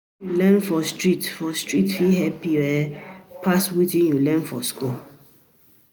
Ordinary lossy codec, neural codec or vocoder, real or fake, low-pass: none; none; real; none